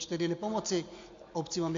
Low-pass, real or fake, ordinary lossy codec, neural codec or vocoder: 7.2 kHz; real; MP3, 48 kbps; none